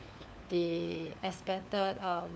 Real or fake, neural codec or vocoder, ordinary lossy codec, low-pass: fake; codec, 16 kHz, 4 kbps, FunCodec, trained on LibriTTS, 50 frames a second; none; none